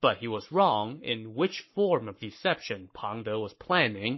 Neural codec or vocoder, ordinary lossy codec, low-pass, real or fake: codec, 44.1 kHz, 7.8 kbps, Pupu-Codec; MP3, 24 kbps; 7.2 kHz; fake